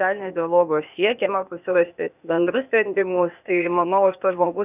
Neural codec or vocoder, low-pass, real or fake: codec, 16 kHz, 0.8 kbps, ZipCodec; 3.6 kHz; fake